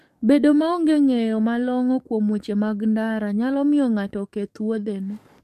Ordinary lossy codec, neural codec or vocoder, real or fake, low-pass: MP3, 64 kbps; codec, 44.1 kHz, 7.8 kbps, DAC; fake; 14.4 kHz